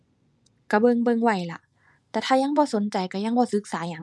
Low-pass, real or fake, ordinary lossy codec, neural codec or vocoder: none; fake; none; vocoder, 24 kHz, 100 mel bands, Vocos